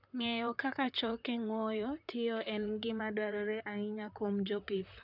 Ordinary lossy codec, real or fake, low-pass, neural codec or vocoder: none; fake; 5.4 kHz; vocoder, 44.1 kHz, 128 mel bands, Pupu-Vocoder